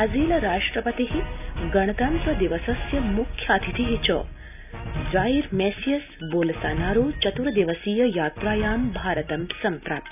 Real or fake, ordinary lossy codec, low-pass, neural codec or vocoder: real; none; 3.6 kHz; none